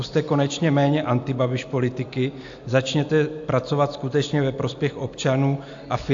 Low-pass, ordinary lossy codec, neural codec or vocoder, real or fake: 7.2 kHz; AAC, 64 kbps; none; real